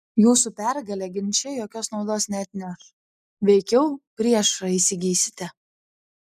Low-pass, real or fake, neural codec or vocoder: 14.4 kHz; real; none